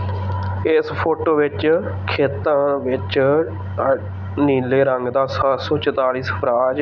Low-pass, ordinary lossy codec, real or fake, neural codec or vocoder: 7.2 kHz; none; real; none